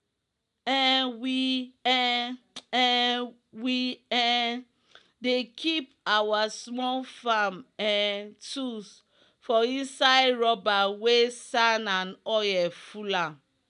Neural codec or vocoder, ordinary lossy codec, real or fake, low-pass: none; none; real; 10.8 kHz